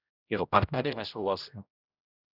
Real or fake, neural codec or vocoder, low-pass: fake; codec, 16 kHz, 0.5 kbps, X-Codec, HuBERT features, trained on general audio; 5.4 kHz